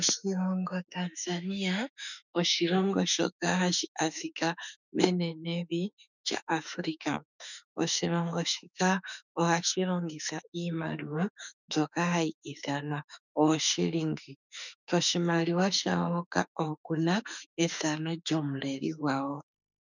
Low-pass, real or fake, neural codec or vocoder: 7.2 kHz; fake; autoencoder, 48 kHz, 32 numbers a frame, DAC-VAE, trained on Japanese speech